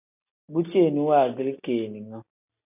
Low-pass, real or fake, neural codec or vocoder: 3.6 kHz; real; none